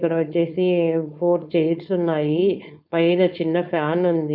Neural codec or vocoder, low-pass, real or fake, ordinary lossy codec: codec, 16 kHz, 4.8 kbps, FACodec; 5.4 kHz; fake; none